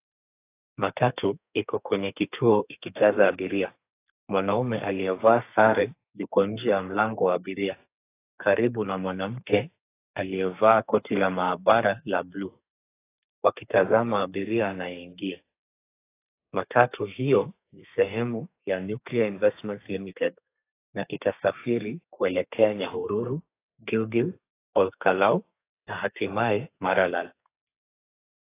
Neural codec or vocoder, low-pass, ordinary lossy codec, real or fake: codec, 32 kHz, 1.9 kbps, SNAC; 3.6 kHz; AAC, 24 kbps; fake